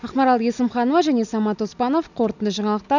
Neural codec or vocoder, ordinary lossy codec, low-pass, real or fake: none; none; 7.2 kHz; real